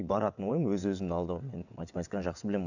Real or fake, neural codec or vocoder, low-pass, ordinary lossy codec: fake; vocoder, 22.05 kHz, 80 mel bands, WaveNeXt; 7.2 kHz; none